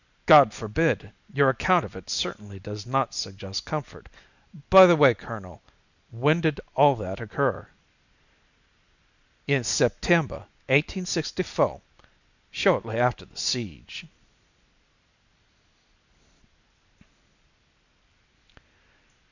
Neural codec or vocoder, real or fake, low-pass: none; real; 7.2 kHz